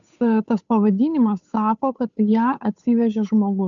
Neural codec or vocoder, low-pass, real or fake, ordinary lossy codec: codec, 16 kHz, 8 kbps, FunCodec, trained on Chinese and English, 25 frames a second; 7.2 kHz; fake; MP3, 96 kbps